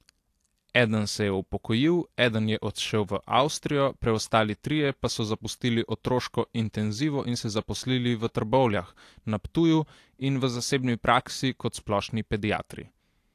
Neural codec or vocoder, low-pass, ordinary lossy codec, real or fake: none; 14.4 kHz; AAC, 64 kbps; real